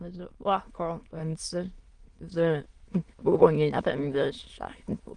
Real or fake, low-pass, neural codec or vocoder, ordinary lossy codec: fake; 9.9 kHz; autoencoder, 22.05 kHz, a latent of 192 numbers a frame, VITS, trained on many speakers; Opus, 24 kbps